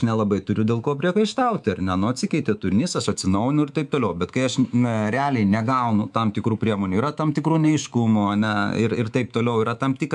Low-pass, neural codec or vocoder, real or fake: 10.8 kHz; codec, 24 kHz, 3.1 kbps, DualCodec; fake